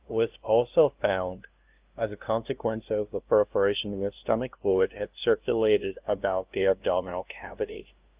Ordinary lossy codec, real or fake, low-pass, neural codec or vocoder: Opus, 24 kbps; fake; 3.6 kHz; codec, 16 kHz, 0.5 kbps, FunCodec, trained on LibriTTS, 25 frames a second